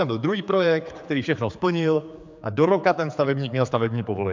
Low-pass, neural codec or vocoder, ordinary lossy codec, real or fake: 7.2 kHz; codec, 16 kHz, 4 kbps, X-Codec, HuBERT features, trained on general audio; MP3, 64 kbps; fake